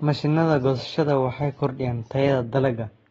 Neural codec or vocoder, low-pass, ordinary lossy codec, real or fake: none; 19.8 kHz; AAC, 24 kbps; real